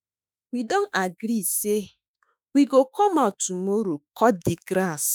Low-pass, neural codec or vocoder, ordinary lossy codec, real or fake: none; autoencoder, 48 kHz, 32 numbers a frame, DAC-VAE, trained on Japanese speech; none; fake